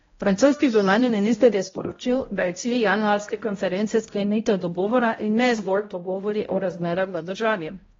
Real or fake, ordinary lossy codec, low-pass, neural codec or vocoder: fake; AAC, 24 kbps; 7.2 kHz; codec, 16 kHz, 0.5 kbps, X-Codec, HuBERT features, trained on balanced general audio